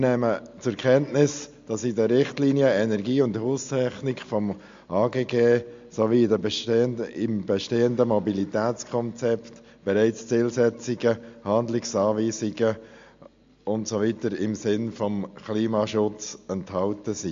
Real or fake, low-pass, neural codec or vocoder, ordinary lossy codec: real; 7.2 kHz; none; MP3, 48 kbps